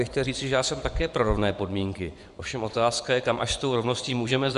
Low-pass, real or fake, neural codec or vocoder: 10.8 kHz; fake; vocoder, 24 kHz, 100 mel bands, Vocos